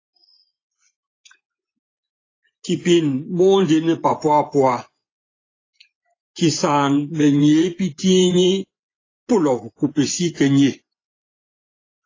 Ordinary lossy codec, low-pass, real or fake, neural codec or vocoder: AAC, 32 kbps; 7.2 kHz; fake; vocoder, 24 kHz, 100 mel bands, Vocos